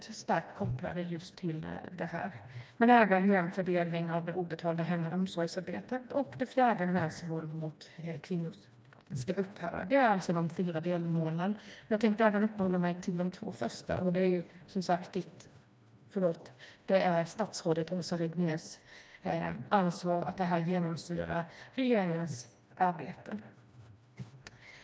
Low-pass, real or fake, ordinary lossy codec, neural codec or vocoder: none; fake; none; codec, 16 kHz, 1 kbps, FreqCodec, smaller model